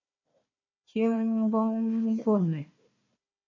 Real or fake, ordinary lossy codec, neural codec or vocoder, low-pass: fake; MP3, 32 kbps; codec, 16 kHz, 1 kbps, FunCodec, trained on Chinese and English, 50 frames a second; 7.2 kHz